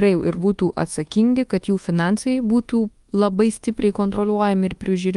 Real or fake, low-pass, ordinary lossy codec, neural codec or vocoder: fake; 10.8 kHz; Opus, 32 kbps; codec, 24 kHz, 1.2 kbps, DualCodec